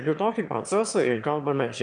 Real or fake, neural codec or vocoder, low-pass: fake; autoencoder, 22.05 kHz, a latent of 192 numbers a frame, VITS, trained on one speaker; 9.9 kHz